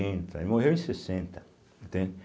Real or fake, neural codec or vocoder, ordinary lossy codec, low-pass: real; none; none; none